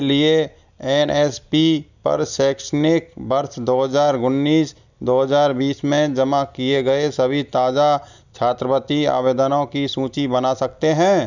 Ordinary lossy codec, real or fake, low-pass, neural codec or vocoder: none; real; 7.2 kHz; none